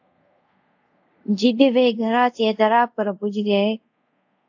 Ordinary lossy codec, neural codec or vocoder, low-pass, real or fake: AAC, 48 kbps; codec, 24 kHz, 0.5 kbps, DualCodec; 7.2 kHz; fake